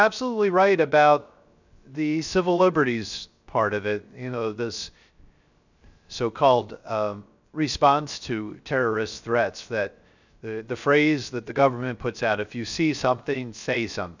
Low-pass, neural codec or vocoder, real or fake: 7.2 kHz; codec, 16 kHz, 0.3 kbps, FocalCodec; fake